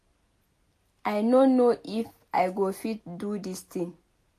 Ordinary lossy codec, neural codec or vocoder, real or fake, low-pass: AAC, 64 kbps; none; real; 14.4 kHz